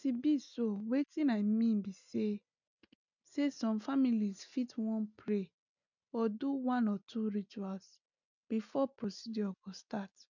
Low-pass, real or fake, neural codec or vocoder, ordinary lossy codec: 7.2 kHz; real; none; none